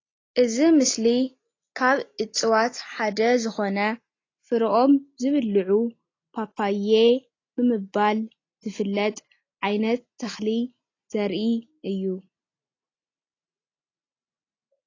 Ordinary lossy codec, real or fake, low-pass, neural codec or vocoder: AAC, 32 kbps; real; 7.2 kHz; none